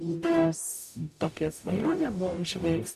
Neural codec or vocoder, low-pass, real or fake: codec, 44.1 kHz, 0.9 kbps, DAC; 14.4 kHz; fake